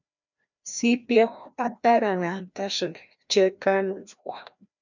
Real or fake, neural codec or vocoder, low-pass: fake; codec, 16 kHz, 1 kbps, FreqCodec, larger model; 7.2 kHz